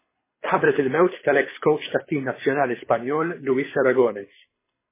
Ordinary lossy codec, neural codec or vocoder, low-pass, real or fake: MP3, 16 kbps; codec, 24 kHz, 6 kbps, HILCodec; 3.6 kHz; fake